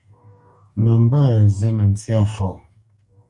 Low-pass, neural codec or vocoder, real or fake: 10.8 kHz; codec, 44.1 kHz, 2.6 kbps, DAC; fake